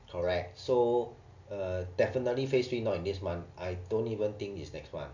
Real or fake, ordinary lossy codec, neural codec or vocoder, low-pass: real; none; none; 7.2 kHz